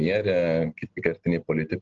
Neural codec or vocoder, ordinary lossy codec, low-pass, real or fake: none; Opus, 16 kbps; 7.2 kHz; real